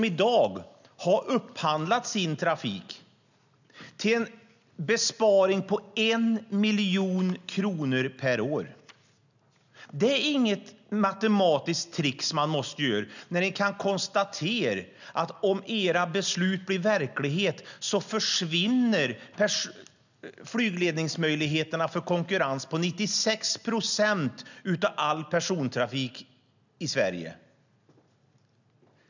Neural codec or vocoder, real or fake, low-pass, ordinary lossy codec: none; real; 7.2 kHz; none